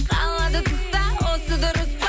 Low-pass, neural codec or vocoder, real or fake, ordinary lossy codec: none; none; real; none